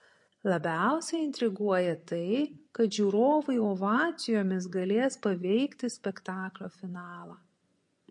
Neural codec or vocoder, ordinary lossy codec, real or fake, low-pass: vocoder, 24 kHz, 100 mel bands, Vocos; MP3, 48 kbps; fake; 10.8 kHz